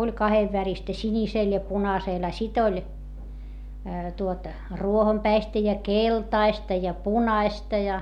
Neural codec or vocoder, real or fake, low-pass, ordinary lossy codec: none; real; 19.8 kHz; none